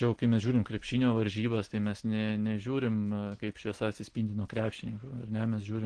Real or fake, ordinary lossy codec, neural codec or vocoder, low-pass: real; Opus, 16 kbps; none; 9.9 kHz